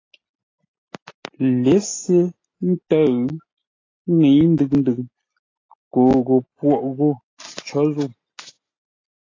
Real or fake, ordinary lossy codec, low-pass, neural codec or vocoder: real; AAC, 32 kbps; 7.2 kHz; none